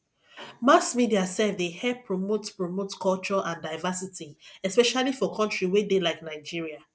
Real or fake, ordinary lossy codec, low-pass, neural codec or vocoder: real; none; none; none